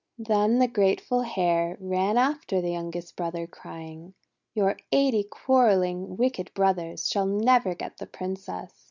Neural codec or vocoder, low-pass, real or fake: none; 7.2 kHz; real